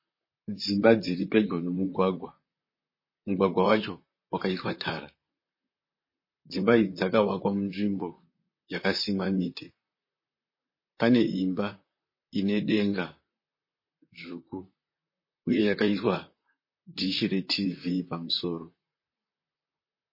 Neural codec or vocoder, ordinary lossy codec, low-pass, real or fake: vocoder, 44.1 kHz, 128 mel bands, Pupu-Vocoder; MP3, 24 kbps; 5.4 kHz; fake